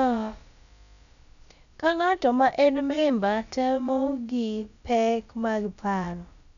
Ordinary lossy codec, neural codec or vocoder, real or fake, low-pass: MP3, 96 kbps; codec, 16 kHz, about 1 kbps, DyCAST, with the encoder's durations; fake; 7.2 kHz